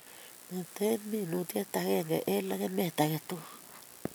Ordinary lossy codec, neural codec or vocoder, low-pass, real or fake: none; none; none; real